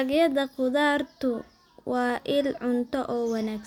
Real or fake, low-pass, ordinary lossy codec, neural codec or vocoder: real; 19.8 kHz; none; none